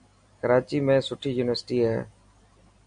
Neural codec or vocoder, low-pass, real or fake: none; 9.9 kHz; real